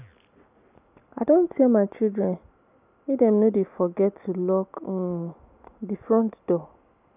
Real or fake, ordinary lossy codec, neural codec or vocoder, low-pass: real; none; none; 3.6 kHz